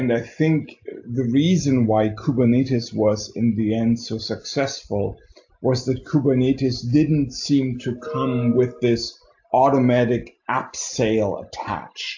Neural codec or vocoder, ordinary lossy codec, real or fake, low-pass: none; AAC, 48 kbps; real; 7.2 kHz